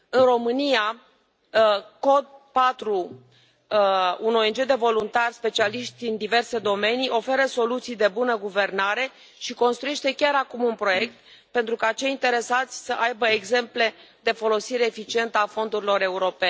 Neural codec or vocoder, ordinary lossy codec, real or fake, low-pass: none; none; real; none